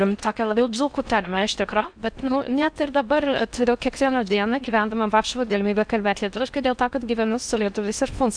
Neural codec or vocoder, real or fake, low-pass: codec, 16 kHz in and 24 kHz out, 0.6 kbps, FocalCodec, streaming, 4096 codes; fake; 9.9 kHz